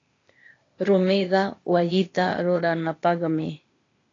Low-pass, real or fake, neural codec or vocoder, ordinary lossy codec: 7.2 kHz; fake; codec, 16 kHz, 0.8 kbps, ZipCodec; AAC, 32 kbps